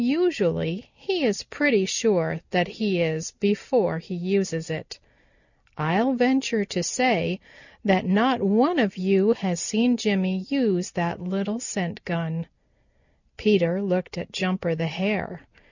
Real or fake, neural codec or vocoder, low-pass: real; none; 7.2 kHz